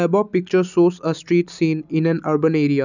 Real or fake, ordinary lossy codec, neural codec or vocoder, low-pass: real; none; none; 7.2 kHz